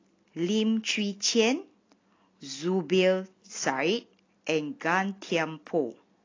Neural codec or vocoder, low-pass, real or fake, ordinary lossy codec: none; 7.2 kHz; real; AAC, 32 kbps